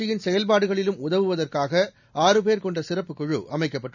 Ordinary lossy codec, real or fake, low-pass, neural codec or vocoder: none; real; 7.2 kHz; none